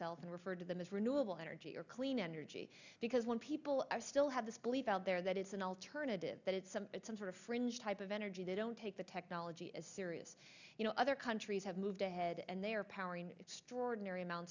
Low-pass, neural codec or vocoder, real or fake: 7.2 kHz; none; real